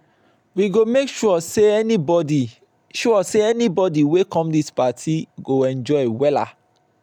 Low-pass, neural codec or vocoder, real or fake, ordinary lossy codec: 19.8 kHz; none; real; none